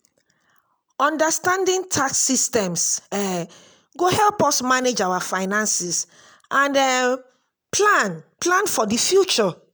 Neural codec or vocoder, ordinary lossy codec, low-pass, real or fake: none; none; none; real